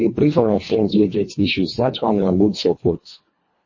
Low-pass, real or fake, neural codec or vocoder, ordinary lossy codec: 7.2 kHz; fake; codec, 24 kHz, 1.5 kbps, HILCodec; MP3, 32 kbps